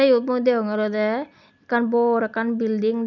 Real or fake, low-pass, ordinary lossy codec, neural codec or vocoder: real; 7.2 kHz; none; none